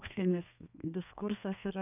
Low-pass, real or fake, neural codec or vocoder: 3.6 kHz; fake; codec, 32 kHz, 1.9 kbps, SNAC